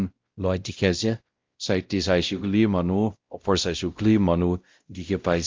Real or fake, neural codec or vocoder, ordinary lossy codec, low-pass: fake; codec, 16 kHz, 0.5 kbps, X-Codec, WavLM features, trained on Multilingual LibriSpeech; Opus, 24 kbps; 7.2 kHz